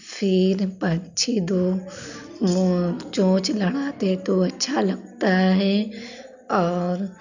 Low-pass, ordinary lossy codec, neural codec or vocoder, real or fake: 7.2 kHz; none; none; real